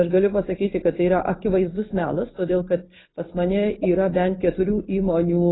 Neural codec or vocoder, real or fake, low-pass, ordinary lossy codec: none; real; 7.2 kHz; AAC, 16 kbps